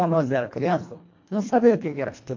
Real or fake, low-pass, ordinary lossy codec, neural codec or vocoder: fake; 7.2 kHz; MP3, 48 kbps; codec, 24 kHz, 1.5 kbps, HILCodec